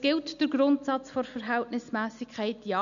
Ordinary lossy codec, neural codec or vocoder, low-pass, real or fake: none; none; 7.2 kHz; real